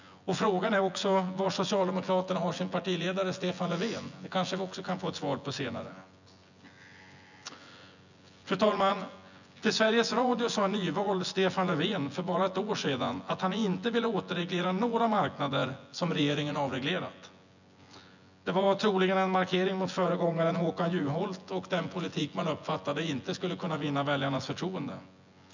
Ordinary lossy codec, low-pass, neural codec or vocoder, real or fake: none; 7.2 kHz; vocoder, 24 kHz, 100 mel bands, Vocos; fake